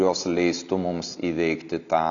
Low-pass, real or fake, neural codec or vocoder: 7.2 kHz; real; none